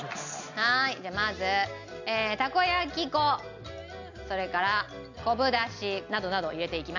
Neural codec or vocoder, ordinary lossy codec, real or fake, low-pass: none; none; real; 7.2 kHz